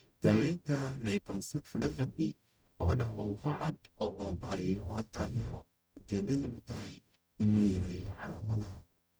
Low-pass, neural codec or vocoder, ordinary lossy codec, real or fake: none; codec, 44.1 kHz, 0.9 kbps, DAC; none; fake